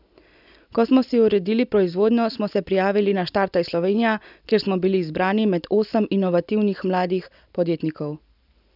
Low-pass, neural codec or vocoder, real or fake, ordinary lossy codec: 5.4 kHz; none; real; none